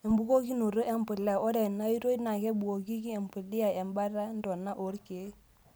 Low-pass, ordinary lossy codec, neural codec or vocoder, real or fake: none; none; none; real